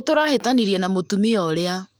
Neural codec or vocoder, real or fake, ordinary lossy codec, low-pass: codec, 44.1 kHz, 7.8 kbps, DAC; fake; none; none